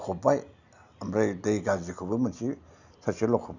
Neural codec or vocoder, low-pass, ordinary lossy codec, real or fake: none; 7.2 kHz; none; real